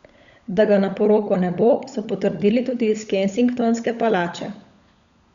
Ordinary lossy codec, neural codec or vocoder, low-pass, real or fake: Opus, 64 kbps; codec, 16 kHz, 16 kbps, FunCodec, trained on LibriTTS, 50 frames a second; 7.2 kHz; fake